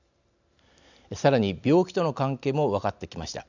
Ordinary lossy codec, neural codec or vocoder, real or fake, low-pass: none; none; real; 7.2 kHz